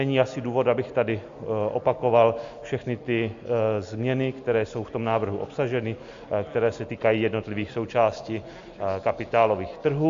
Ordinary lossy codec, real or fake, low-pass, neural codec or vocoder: AAC, 64 kbps; real; 7.2 kHz; none